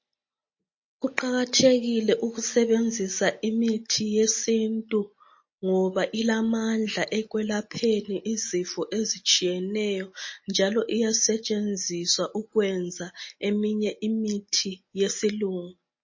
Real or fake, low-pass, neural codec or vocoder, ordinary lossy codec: real; 7.2 kHz; none; MP3, 32 kbps